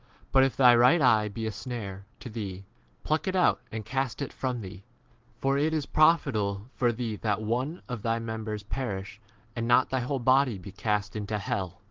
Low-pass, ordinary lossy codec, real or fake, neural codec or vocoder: 7.2 kHz; Opus, 16 kbps; real; none